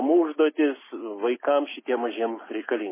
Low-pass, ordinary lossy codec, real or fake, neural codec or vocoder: 3.6 kHz; MP3, 16 kbps; real; none